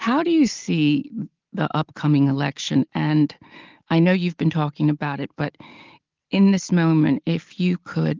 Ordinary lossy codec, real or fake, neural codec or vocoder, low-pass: Opus, 32 kbps; real; none; 7.2 kHz